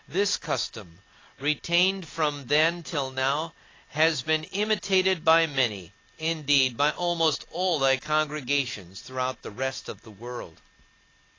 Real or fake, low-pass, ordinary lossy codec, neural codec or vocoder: real; 7.2 kHz; AAC, 32 kbps; none